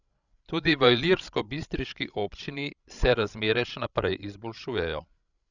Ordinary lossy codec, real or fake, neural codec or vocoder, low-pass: none; fake; codec, 16 kHz, 16 kbps, FreqCodec, larger model; 7.2 kHz